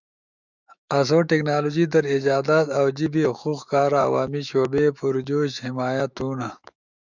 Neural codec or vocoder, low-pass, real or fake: autoencoder, 48 kHz, 128 numbers a frame, DAC-VAE, trained on Japanese speech; 7.2 kHz; fake